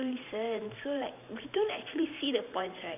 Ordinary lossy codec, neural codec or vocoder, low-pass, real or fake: none; vocoder, 44.1 kHz, 128 mel bands every 512 samples, BigVGAN v2; 3.6 kHz; fake